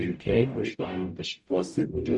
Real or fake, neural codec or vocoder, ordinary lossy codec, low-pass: fake; codec, 44.1 kHz, 0.9 kbps, DAC; Opus, 64 kbps; 10.8 kHz